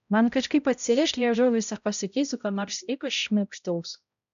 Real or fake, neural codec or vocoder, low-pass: fake; codec, 16 kHz, 0.5 kbps, X-Codec, HuBERT features, trained on balanced general audio; 7.2 kHz